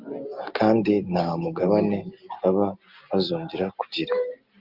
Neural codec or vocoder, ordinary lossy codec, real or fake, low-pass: none; Opus, 24 kbps; real; 5.4 kHz